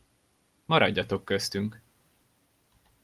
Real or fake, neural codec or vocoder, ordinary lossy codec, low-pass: real; none; Opus, 32 kbps; 14.4 kHz